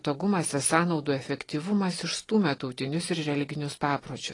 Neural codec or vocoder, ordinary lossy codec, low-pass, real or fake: none; AAC, 32 kbps; 10.8 kHz; real